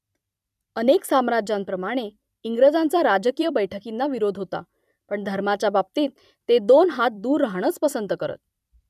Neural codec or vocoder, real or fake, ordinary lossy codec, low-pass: vocoder, 44.1 kHz, 128 mel bands every 256 samples, BigVGAN v2; fake; none; 14.4 kHz